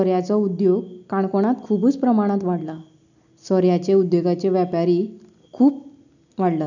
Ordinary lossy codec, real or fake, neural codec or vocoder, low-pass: none; real; none; 7.2 kHz